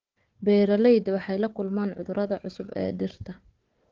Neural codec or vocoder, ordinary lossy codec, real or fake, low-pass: codec, 16 kHz, 4 kbps, FunCodec, trained on Chinese and English, 50 frames a second; Opus, 16 kbps; fake; 7.2 kHz